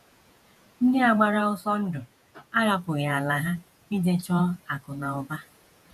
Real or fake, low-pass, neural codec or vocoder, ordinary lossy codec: fake; 14.4 kHz; vocoder, 48 kHz, 128 mel bands, Vocos; none